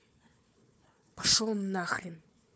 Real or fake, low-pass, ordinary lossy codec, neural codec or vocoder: fake; none; none; codec, 16 kHz, 4 kbps, FunCodec, trained on Chinese and English, 50 frames a second